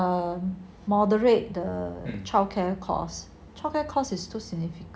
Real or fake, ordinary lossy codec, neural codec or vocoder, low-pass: real; none; none; none